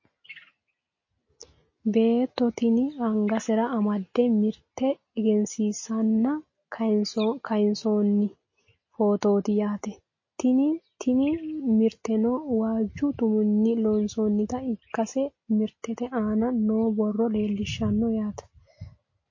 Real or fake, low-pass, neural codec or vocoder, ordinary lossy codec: real; 7.2 kHz; none; MP3, 32 kbps